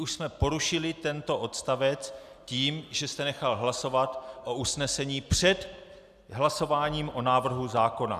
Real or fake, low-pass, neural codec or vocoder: real; 14.4 kHz; none